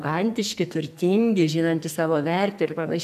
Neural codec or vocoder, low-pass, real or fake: codec, 44.1 kHz, 2.6 kbps, SNAC; 14.4 kHz; fake